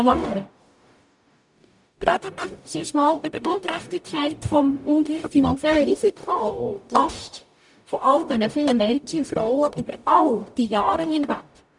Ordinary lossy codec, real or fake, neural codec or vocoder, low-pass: none; fake; codec, 44.1 kHz, 0.9 kbps, DAC; 10.8 kHz